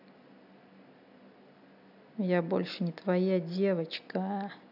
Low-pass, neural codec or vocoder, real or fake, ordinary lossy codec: 5.4 kHz; none; real; none